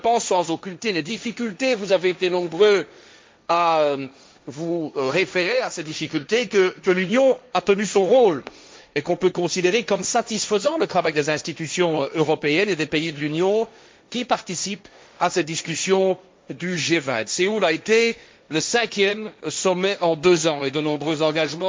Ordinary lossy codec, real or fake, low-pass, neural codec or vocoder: none; fake; none; codec, 16 kHz, 1.1 kbps, Voila-Tokenizer